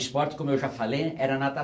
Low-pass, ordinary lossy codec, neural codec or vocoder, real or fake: none; none; none; real